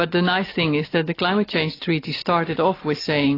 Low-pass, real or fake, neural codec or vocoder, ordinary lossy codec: 5.4 kHz; real; none; AAC, 24 kbps